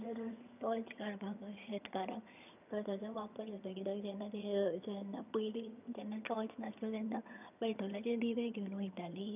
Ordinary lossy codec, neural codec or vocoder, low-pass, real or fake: none; vocoder, 22.05 kHz, 80 mel bands, HiFi-GAN; 3.6 kHz; fake